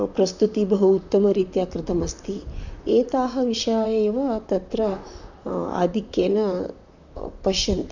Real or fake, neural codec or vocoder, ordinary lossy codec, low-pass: fake; vocoder, 44.1 kHz, 128 mel bands, Pupu-Vocoder; none; 7.2 kHz